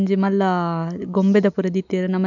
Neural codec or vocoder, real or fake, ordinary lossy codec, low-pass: none; real; none; 7.2 kHz